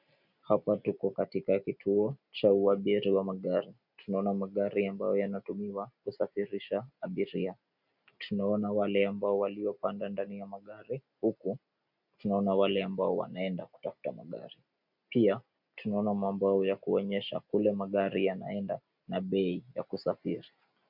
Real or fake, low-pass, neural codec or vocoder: real; 5.4 kHz; none